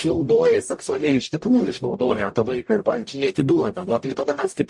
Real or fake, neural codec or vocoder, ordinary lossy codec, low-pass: fake; codec, 44.1 kHz, 0.9 kbps, DAC; MP3, 48 kbps; 10.8 kHz